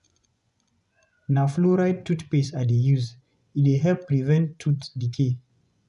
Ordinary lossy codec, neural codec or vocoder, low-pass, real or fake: none; vocoder, 24 kHz, 100 mel bands, Vocos; 10.8 kHz; fake